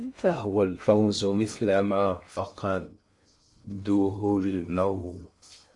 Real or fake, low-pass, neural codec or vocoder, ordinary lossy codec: fake; 10.8 kHz; codec, 16 kHz in and 24 kHz out, 0.6 kbps, FocalCodec, streaming, 2048 codes; MP3, 64 kbps